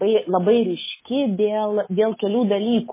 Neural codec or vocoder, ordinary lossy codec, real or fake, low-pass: autoencoder, 48 kHz, 128 numbers a frame, DAC-VAE, trained on Japanese speech; MP3, 16 kbps; fake; 3.6 kHz